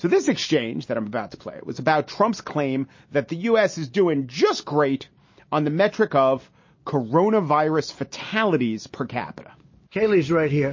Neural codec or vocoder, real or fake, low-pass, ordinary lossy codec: none; real; 7.2 kHz; MP3, 32 kbps